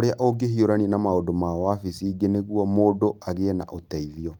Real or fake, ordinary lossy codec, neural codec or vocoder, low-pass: real; Opus, 32 kbps; none; 19.8 kHz